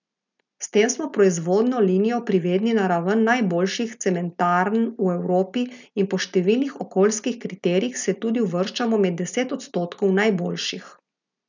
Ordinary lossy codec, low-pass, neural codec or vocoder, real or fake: none; 7.2 kHz; none; real